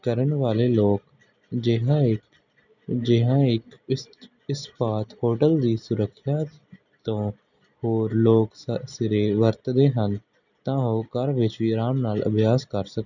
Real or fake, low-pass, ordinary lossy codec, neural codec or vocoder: real; 7.2 kHz; none; none